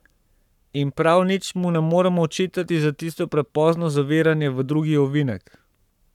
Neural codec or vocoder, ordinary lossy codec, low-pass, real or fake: codec, 44.1 kHz, 7.8 kbps, Pupu-Codec; none; 19.8 kHz; fake